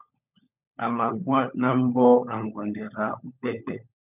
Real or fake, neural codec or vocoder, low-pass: fake; codec, 16 kHz, 16 kbps, FunCodec, trained on LibriTTS, 50 frames a second; 3.6 kHz